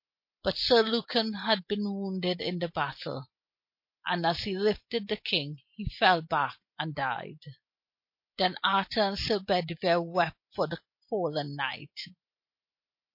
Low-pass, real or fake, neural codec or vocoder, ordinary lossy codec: 5.4 kHz; real; none; MP3, 32 kbps